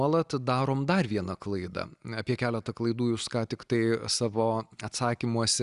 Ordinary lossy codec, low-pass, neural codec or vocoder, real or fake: Opus, 64 kbps; 10.8 kHz; none; real